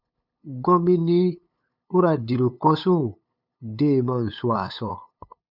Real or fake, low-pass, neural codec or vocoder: fake; 5.4 kHz; codec, 16 kHz, 8 kbps, FunCodec, trained on LibriTTS, 25 frames a second